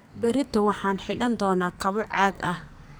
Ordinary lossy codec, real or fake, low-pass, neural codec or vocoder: none; fake; none; codec, 44.1 kHz, 2.6 kbps, SNAC